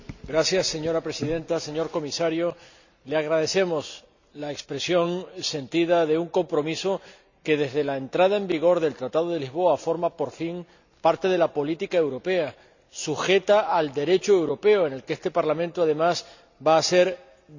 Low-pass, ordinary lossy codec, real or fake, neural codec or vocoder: 7.2 kHz; none; real; none